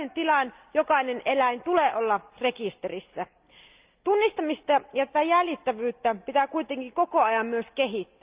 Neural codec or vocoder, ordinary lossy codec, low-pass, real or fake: none; Opus, 24 kbps; 3.6 kHz; real